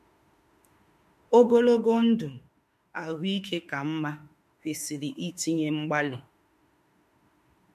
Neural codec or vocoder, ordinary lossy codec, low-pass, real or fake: autoencoder, 48 kHz, 32 numbers a frame, DAC-VAE, trained on Japanese speech; MP3, 64 kbps; 14.4 kHz; fake